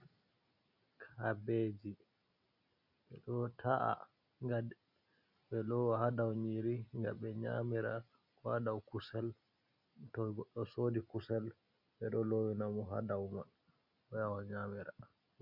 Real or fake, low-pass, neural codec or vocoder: real; 5.4 kHz; none